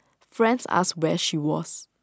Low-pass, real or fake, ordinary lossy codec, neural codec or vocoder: none; real; none; none